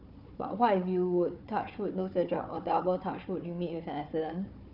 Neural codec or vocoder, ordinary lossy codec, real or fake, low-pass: codec, 16 kHz, 4 kbps, FunCodec, trained on Chinese and English, 50 frames a second; none; fake; 5.4 kHz